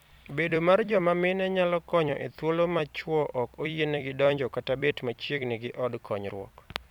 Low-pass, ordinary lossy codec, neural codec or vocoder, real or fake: 19.8 kHz; none; vocoder, 44.1 kHz, 128 mel bands every 256 samples, BigVGAN v2; fake